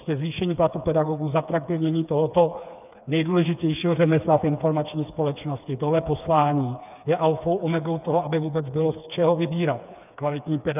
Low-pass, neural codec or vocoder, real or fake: 3.6 kHz; codec, 16 kHz, 4 kbps, FreqCodec, smaller model; fake